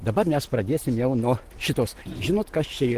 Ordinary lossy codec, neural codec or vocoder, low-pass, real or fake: Opus, 16 kbps; none; 14.4 kHz; real